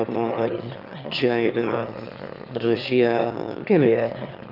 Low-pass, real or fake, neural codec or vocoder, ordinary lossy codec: 5.4 kHz; fake; autoencoder, 22.05 kHz, a latent of 192 numbers a frame, VITS, trained on one speaker; Opus, 24 kbps